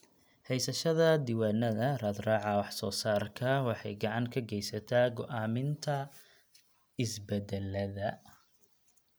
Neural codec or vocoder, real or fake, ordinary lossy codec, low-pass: none; real; none; none